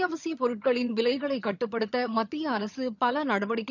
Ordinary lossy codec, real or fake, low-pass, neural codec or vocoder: none; fake; 7.2 kHz; vocoder, 22.05 kHz, 80 mel bands, HiFi-GAN